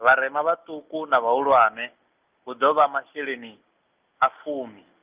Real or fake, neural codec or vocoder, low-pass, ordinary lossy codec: real; none; 3.6 kHz; Opus, 32 kbps